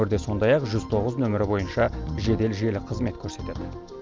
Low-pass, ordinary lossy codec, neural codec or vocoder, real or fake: 7.2 kHz; Opus, 24 kbps; none; real